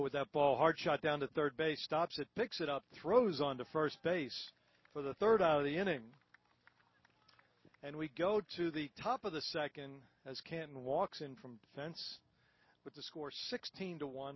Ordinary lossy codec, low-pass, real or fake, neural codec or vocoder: MP3, 24 kbps; 7.2 kHz; real; none